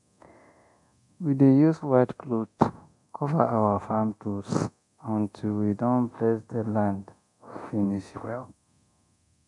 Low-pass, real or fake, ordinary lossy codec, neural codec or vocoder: 10.8 kHz; fake; none; codec, 24 kHz, 0.9 kbps, DualCodec